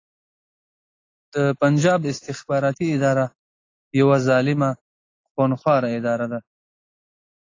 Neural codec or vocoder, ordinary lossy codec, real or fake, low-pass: none; AAC, 32 kbps; real; 7.2 kHz